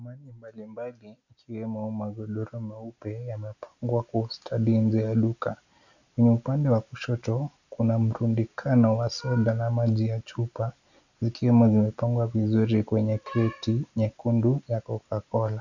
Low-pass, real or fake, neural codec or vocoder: 7.2 kHz; real; none